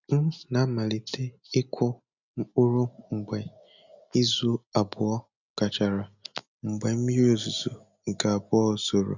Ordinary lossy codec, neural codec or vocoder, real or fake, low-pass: none; none; real; 7.2 kHz